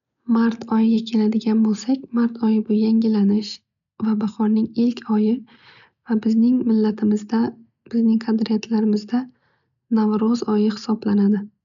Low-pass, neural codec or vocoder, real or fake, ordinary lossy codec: 7.2 kHz; none; real; none